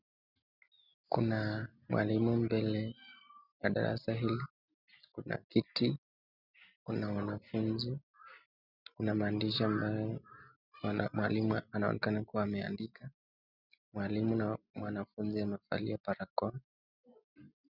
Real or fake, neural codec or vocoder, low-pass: real; none; 5.4 kHz